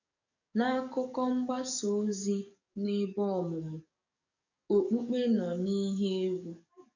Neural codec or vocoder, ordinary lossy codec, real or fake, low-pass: codec, 44.1 kHz, 7.8 kbps, DAC; none; fake; 7.2 kHz